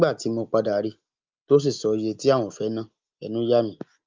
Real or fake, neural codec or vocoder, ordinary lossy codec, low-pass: real; none; Opus, 24 kbps; 7.2 kHz